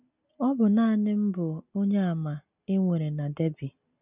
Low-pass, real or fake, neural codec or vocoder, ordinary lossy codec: 3.6 kHz; real; none; none